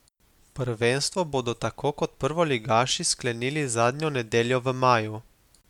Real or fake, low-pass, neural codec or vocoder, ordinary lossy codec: real; 19.8 kHz; none; MP3, 96 kbps